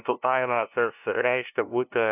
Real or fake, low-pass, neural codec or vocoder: fake; 3.6 kHz; codec, 16 kHz, 0.5 kbps, FunCodec, trained on LibriTTS, 25 frames a second